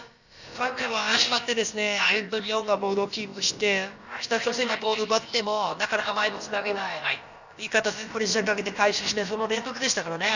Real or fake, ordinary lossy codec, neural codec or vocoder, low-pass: fake; none; codec, 16 kHz, about 1 kbps, DyCAST, with the encoder's durations; 7.2 kHz